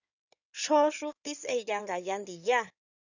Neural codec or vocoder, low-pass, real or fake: codec, 16 kHz in and 24 kHz out, 2.2 kbps, FireRedTTS-2 codec; 7.2 kHz; fake